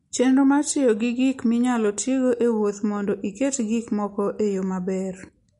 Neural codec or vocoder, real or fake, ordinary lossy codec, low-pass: none; real; MP3, 48 kbps; 14.4 kHz